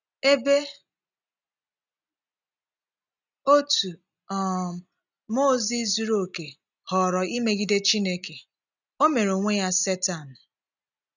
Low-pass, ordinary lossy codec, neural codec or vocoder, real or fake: 7.2 kHz; none; none; real